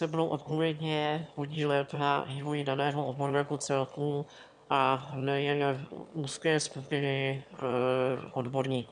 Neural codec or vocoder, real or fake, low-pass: autoencoder, 22.05 kHz, a latent of 192 numbers a frame, VITS, trained on one speaker; fake; 9.9 kHz